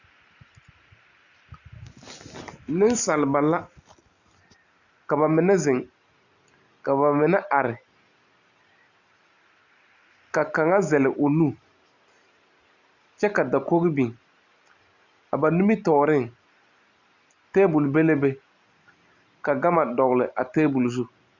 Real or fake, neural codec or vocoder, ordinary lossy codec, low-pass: real; none; Opus, 64 kbps; 7.2 kHz